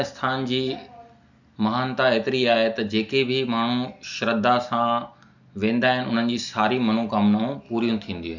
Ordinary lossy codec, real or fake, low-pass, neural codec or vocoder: none; real; 7.2 kHz; none